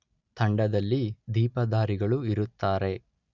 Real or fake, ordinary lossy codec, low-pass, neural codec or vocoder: real; none; 7.2 kHz; none